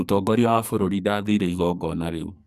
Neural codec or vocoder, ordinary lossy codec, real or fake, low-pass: codec, 44.1 kHz, 2.6 kbps, SNAC; none; fake; 14.4 kHz